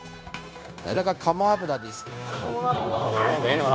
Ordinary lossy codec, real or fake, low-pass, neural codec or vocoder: none; fake; none; codec, 16 kHz, 0.9 kbps, LongCat-Audio-Codec